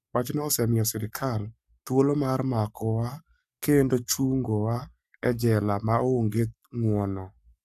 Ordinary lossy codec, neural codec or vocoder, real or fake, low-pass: none; codec, 44.1 kHz, 7.8 kbps, Pupu-Codec; fake; 14.4 kHz